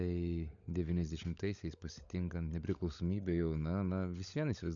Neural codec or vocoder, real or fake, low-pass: codec, 16 kHz, 16 kbps, FunCodec, trained on LibriTTS, 50 frames a second; fake; 7.2 kHz